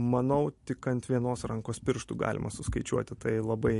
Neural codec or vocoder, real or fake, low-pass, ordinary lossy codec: none; real; 14.4 kHz; MP3, 48 kbps